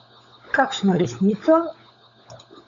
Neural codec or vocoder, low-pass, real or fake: codec, 16 kHz, 16 kbps, FunCodec, trained on LibriTTS, 50 frames a second; 7.2 kHz; fake